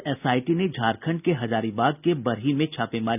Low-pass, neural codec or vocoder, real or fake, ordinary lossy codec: 3.6 kHz; none; real; none